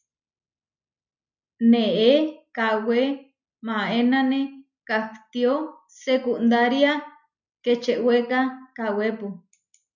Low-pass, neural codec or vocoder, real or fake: 7.2 kHz; none; real